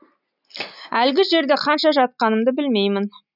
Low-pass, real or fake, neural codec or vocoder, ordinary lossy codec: 5.4 kHz; real; none; none